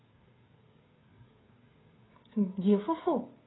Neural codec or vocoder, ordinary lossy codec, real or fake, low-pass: codec, 16 kHz, 16 kbps, FreqCodec, smaller model; AAC, 16 kbps; fake; 7.2 kHz